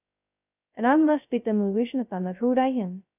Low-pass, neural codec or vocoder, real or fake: 3.6 kHz; codec, 16 kHz, 0.2 kbps, FocalCodec; fake